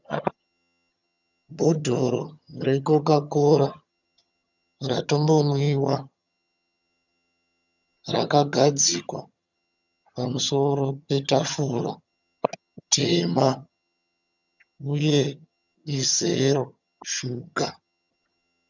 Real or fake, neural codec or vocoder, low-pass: fake; vocoder, 22.05 kHz, 80 mel bands, HiFi-GAN; 7.2 kHz